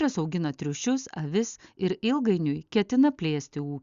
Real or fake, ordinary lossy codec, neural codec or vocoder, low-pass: real; Opus, 64 kbps; none; 7.2 kHz